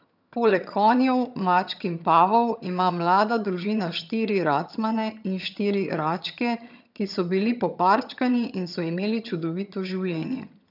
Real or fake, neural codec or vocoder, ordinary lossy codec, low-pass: fake; vocoder, 22.05 kHz, 80 mel bands, HiFi-GAN; none; 5.4 kHz